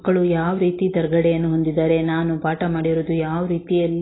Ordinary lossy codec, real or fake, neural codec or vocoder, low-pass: AAC, 16 kbps; real; none; 7.2 kHz